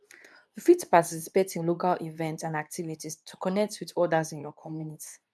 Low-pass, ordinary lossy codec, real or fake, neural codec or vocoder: none; none; fake; codec, 24 kHz, 0.9 kbps, WavTokenizer, medium speech release version 2